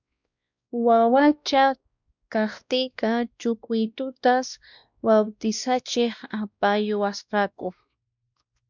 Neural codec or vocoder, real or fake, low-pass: codec, 16 kHz, 1 kbps, X-Codec, WavLM features, trained on Multilingual LibriSpeech; fake; 7.2 kHz